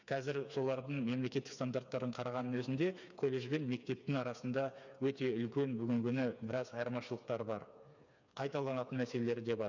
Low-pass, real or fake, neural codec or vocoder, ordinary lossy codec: 7.2 kHz; fake; codec, 16 kHz, 4 kbps, FreqCodec, smaller model; none